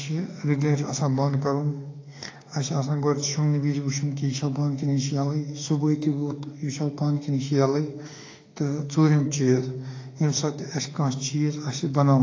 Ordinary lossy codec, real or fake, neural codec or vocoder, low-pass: AAC, 32 kbps; fake; autoencoder, 48 kHz, 32 numbers a frame, DAC-VAE, trained on Japanese speech; 7.2 kHz